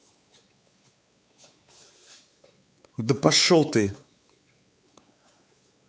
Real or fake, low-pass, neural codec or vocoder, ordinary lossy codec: fake; none; codec, 16 kHz, 4 kbps, X-Codec, WavLM features, trained on Multilingual LibriSpeech; none